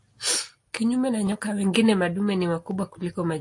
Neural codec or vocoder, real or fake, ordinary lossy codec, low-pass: none; real; AAC, 48 kbps; 10.8 kHz